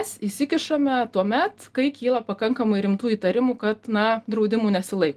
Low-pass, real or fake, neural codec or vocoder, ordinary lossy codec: 14.4 kHz; real; none; Opus, 24 kbps